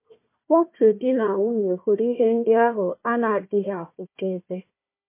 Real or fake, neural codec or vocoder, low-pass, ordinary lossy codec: fake; codec, 16 kHz, 1 kbps, FunCodec, trained on Chinese and English, 50 frames a second; 3.6 kHz; MP3, 24 kbps